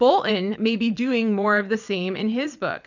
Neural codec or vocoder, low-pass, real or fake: vocoder, 22.05 kHz, 80 mel bands, WaveNeXt; 7.2 kHz; fake